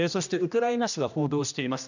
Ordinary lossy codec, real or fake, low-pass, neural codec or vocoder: none; fake; 7.2 kHz; codec, 16 kHz, 1 kbps, X-Codec, HuBERT features, trained on general audio